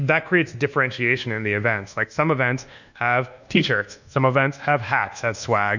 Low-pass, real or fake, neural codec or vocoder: 7.2 kHz; fake; codec, 24 kHz, 1.2 kbps, DualCodec